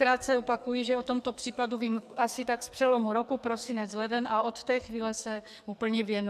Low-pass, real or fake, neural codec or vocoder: 14.4 kHz; fake; codec, 44.1 kHz, 2.6 kbps, SNAC